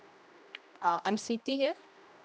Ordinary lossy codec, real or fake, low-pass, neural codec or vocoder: none; fake; none; codec, 16 kHz, 1 kbps, X-Codec, HuBERT features, trained on general audio